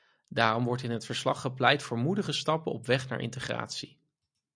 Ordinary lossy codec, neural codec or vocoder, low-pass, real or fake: MP3, 96 kbps; none; 9.9 kHz; real